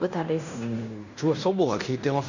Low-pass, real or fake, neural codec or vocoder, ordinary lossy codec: 7.2 kHz; fake; codec, 16 kHz in and 24 kHz out, 0.9 kbps, LongCat-Audio-Codec, fine tuned four codebook decoder; none